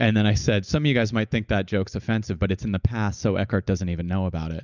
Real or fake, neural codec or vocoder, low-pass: real; none; 7.2 kHz